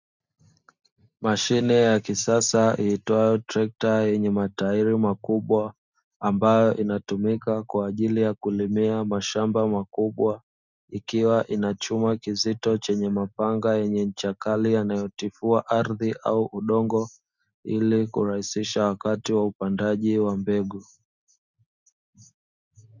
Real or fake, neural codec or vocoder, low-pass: real; none; 7.2 kHz